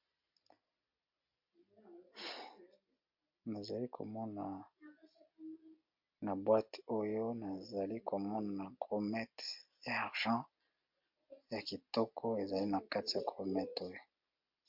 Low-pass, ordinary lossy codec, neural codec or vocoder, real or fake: 5.4 kHz; MP3, 48 kbps; none; real